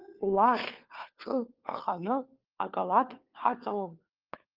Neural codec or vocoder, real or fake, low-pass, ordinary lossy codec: codec, 16 kHz, 4 kbps, FunCodec, trained on LibriTTS, 50 frames a second; fake; 5.4 kHz; Opus, 32 kbps